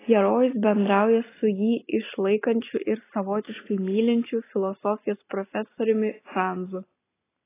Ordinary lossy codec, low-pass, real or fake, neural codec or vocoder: AAC, 16 kbps; 3.6 kHz; real; none